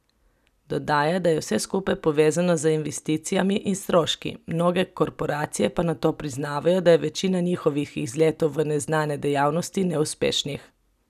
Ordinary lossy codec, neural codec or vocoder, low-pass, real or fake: none; none; 14.4 kHz; real